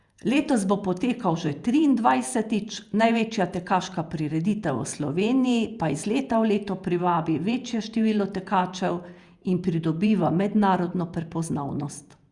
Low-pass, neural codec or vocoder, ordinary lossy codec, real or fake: 10.8 kHz; vocoder, 48 kHz, 128 mel bands, Vocos; Opus, 64 kbps; fake